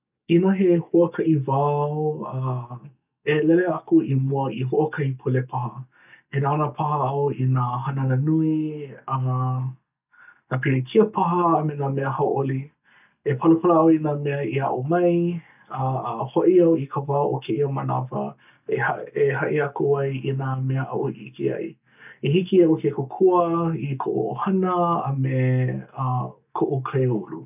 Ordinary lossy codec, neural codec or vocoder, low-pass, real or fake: none; none; 3.6 kHz; real